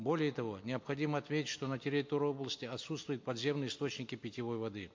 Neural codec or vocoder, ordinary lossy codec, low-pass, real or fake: none; MP3, 48 kbps; 7.2 kHz; real